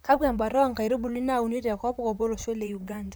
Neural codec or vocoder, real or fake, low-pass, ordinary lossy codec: vocoder, 44.1 kHz, 128 mel bands, Pupu-Vocoder; fake; none; none